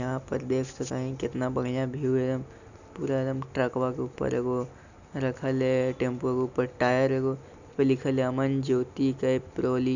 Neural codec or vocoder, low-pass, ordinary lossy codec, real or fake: none; 7.2 kHz; none; real